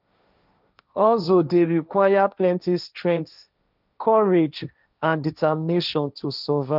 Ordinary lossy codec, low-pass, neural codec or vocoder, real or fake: none; 5.4 kHz; codec, 16 kHz, 1.1 kbps, Voila-Tokenizer; fake